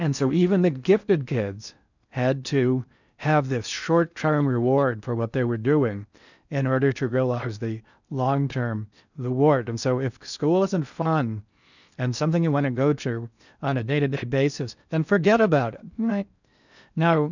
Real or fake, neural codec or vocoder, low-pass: fake; codec, 16 kHz in and 24 kHz out, 0.6 kbps, FocalCodec, streaming, 4096 codes; 7.2 kHz